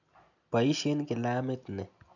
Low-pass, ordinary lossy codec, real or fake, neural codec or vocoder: 7.2 kHz; none; real; none